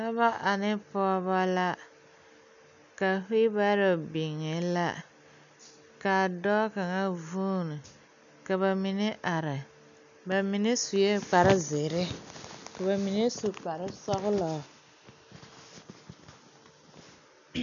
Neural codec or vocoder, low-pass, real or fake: none; 7.2 kHz; real